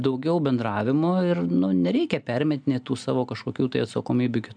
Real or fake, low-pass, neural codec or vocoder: real; 9.9 kHz; none